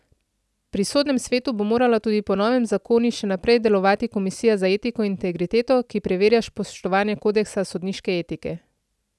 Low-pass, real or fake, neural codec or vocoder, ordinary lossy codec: none; real; none; none